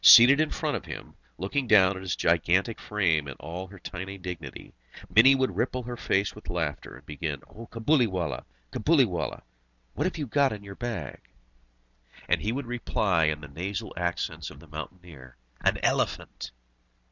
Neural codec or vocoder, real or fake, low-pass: none; real; 7.2 kHz